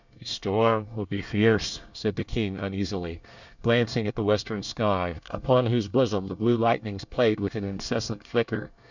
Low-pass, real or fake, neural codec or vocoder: 7.2 kHz; fake; codec, 24 kHz, 1 kbps, SNAC